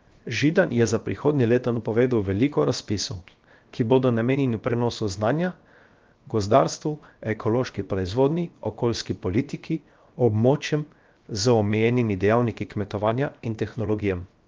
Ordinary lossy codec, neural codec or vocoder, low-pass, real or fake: Opus, 24 kbps; codec, 16 kHz, 0.7 kbps, FocalCodec; 7.2 kHz; fake